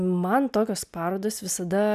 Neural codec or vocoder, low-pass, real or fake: none; 14.4 kHz; real